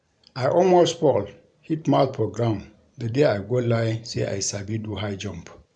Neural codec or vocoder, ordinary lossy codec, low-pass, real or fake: vocoder, 44.1 kHz, 128 mel bands every 512 samples, BigVGAN v2; none; 9.9 kHz; fake